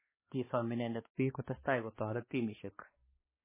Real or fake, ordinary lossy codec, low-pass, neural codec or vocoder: fake; MP3, 16 kbps; 3.6 kHz; codec, 16 kHz, 2 kbps, X-Codec, HuBERT features, trained on balanced general audio